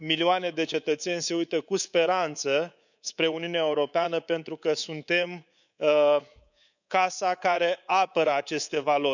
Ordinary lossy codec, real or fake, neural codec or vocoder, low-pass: none; fake; codec, 24 kHz, 3.1 kbps, DualCodec; 7.2 kHz